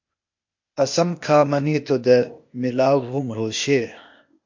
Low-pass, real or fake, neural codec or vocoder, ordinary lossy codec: 7.2 kHz; fake; codec, 16 kHz, 0.8 kbps, ZipCodec; MP3, 48 kbps